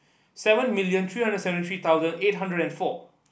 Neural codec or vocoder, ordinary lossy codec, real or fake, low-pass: none; none; real; none